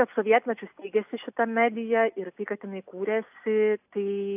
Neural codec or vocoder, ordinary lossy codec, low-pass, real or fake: none; AAC, 32 kbps; 3.6 kHz; real